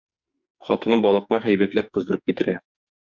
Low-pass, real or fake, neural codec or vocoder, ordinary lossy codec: 7.2 kHz; fake; codec, 44.1 kHz, 2.6 kbps, SNAC; Opus, 64 kbps